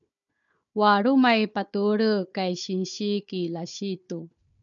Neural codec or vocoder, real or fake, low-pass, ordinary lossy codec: codec, 16 kHz, 4 kbps, FunCodec, trained on Chinese and English, 50 frames a second; fake; 7.2 kHz; MP3, 96 kbps